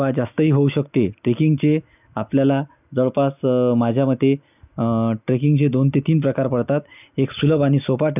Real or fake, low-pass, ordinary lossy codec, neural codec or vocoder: real; 3.6 kHz; none; none